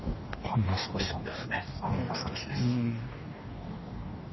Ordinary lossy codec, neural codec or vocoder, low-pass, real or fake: MP3, 24 kbps; codec, 44.1 kHz, 2.6 kbps, DAC; 7.2 kHz; fake